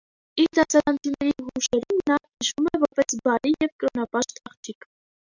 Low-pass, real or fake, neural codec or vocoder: 7.2 kHz; real; none